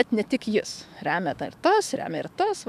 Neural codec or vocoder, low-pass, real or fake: autoencoder, 48 kHz, 128 numbers a frame, DAC-VAE, trained on Japanese speech; 14.4 kHz; fake